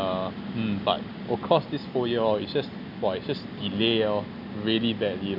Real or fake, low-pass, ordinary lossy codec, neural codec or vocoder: real; 5.4 kHz; none; none